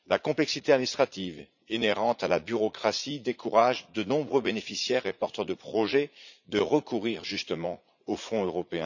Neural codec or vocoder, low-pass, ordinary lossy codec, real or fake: vocoder, 44.1 kHz, 80 mel bands, Vocos; 7.2 kHz; none; fake